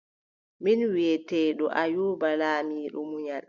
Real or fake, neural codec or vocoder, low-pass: real; none; 7.2 kHz